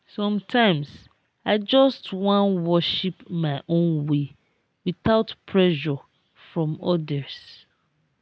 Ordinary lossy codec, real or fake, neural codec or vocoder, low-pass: none; real; none; none